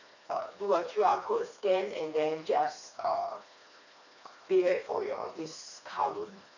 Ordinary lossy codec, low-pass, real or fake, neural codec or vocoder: none; 7.2 kHz; fake; codec, 16 kHz, 2 kbps, FreqCodec, smaller model